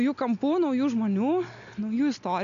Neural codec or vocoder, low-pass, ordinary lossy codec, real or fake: none; 7.2 kHz; MP3, 96 kbps; real